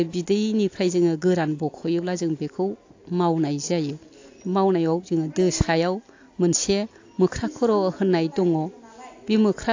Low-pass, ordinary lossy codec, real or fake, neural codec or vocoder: 7.2 kHz; AAC, 48 kbps; real; none